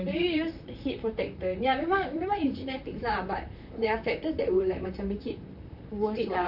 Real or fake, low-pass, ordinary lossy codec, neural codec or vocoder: fake; 5.4 kHz; none; vocoder, 44.1 kHz, 128 mel bands, Pupu-Vocoder